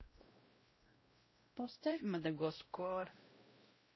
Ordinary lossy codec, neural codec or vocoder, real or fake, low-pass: MP3, 24 kbps; codec, 16 kHz, 0.5 kbps, X-Codec, WavLM features, trained on Multilingual LibriSpeech; fake; 7.2 kHz